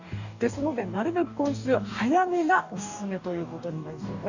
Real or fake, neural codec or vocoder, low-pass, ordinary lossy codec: fake; codec, 44.1 kHz, 2.6 kbps, DAC; 7.2 kHz; none